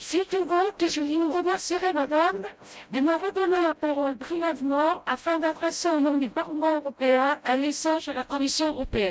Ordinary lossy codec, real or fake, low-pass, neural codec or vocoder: none; fake; none; codec, 16 kHz, 0.5 kbps, FreqCodec, smaller model